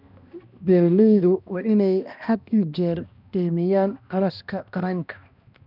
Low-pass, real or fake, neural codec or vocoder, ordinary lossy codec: 5.4 kHz; fake; codec, 16 kHz, 1 kbps, X-Codec, HuBERT features, trained on balanced general audio; none